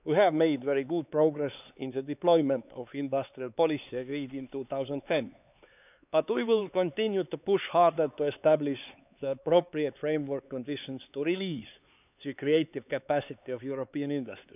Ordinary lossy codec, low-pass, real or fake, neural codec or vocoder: none; 3.6 kHz; fake; codec, 16 kHz, 4 kbps, X-Codec, HuBERT features, trained on LibriSpeech